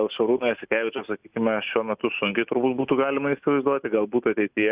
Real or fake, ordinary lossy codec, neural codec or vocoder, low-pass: real; Opus, 64 kbps; none; 3.6 kHz